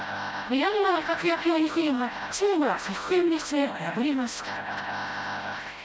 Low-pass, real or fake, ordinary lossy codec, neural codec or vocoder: none; fake; none; codec, 16 kHz, 0.5 kbps, FreqCodec, smaller model